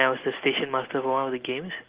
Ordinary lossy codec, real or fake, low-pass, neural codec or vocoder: Opus, 16 kbps; real; 3.6 kHz; none